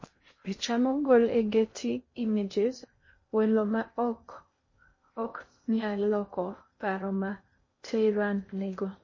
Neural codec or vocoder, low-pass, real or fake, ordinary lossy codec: codec, 16 kHz in and 24 kHz out, 0.6 kbps, FocalCodec, streaming, 2048 codes; 7.2 kHz; fake; MP3, 32 kbps